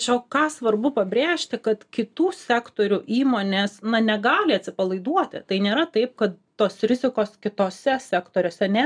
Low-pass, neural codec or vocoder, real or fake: 9.9 kHz; none; real